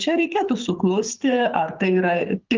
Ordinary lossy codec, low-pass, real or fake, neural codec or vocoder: Opus, 24 kbps; 7.2 kHz; fake; codec, 24 kHz, 6 kbps, HILCodec